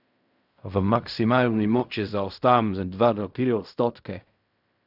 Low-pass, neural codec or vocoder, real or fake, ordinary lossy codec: 5.4 kHz; codec, 16 kHz in and 24 kHz out, 0.4 kbps, LongCat-Audio-Codec, fine tuned four codebook decoder; fake; none